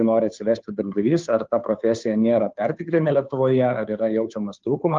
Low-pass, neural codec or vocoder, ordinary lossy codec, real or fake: 7.2 kHz; codec, 16 kHz, 2 kbps, FunCodec, trained on Chinese and English, 25 frames a second; Opus, 24 kbps; fake